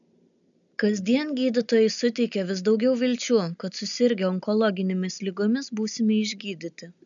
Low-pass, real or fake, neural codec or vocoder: 7.2 kHz; real; none